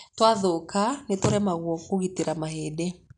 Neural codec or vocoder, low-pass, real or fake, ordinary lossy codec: none; 9.9 kHz; real; none